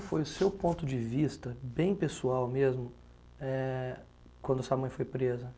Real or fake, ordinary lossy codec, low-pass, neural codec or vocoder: real; none; none; none